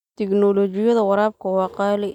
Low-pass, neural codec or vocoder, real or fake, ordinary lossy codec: 19.8 kHz; none; real; none